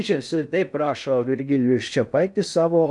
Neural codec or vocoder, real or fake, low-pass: codec, 16 kHz in and 24 kHz out, 0.6 kbps, FocalCodec, streaming, 4096 codes; fake; 10.8 kHz